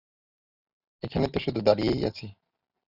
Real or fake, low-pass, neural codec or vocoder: real; 5.4 kHz; none